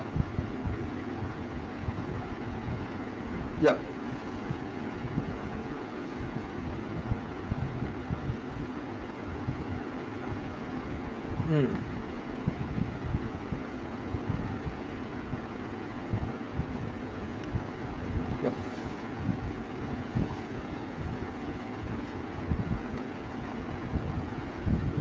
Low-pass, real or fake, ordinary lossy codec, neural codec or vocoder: none; fake; none; codec, 16 kHz, 8 kbps, FreqCodec, smaller model